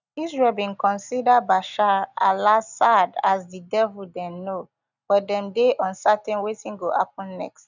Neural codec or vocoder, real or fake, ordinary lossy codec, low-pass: none; real; none; 7.2 kHz